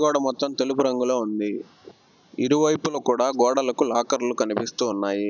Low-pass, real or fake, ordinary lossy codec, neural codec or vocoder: 7.2 kHz; real; none; none